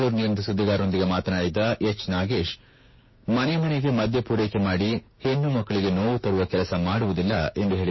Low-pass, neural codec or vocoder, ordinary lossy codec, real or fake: 7.2 kHz; codec, 16 kHz, 6 kbps, DAC; MP3, 24 kbps; fake